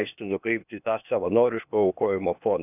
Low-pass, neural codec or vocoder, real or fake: 3.6 kHz; codec, 16 kHz, 0.8 kbps, ZipCodec; fake